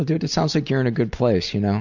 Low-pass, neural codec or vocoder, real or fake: 7.2 kHz; none; real